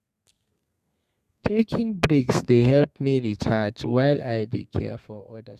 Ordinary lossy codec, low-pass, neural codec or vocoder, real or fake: none; 14.4 kHz; codec, 32 kHz, 1.9 kbps, SNAC; fake